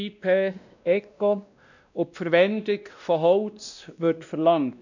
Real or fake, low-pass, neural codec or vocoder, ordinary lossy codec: fake; 7.2 kHz; codec, 16 kHz, 1 kbps, X-Codec, WavLM features, trained on Multilingual LibriSpeech; none